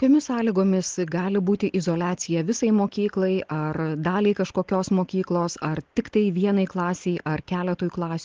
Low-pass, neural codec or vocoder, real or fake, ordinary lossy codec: 7.2 kHz; none; real; Opus, 16 kbps